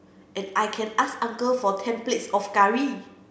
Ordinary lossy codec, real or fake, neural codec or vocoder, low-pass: none; real; none; none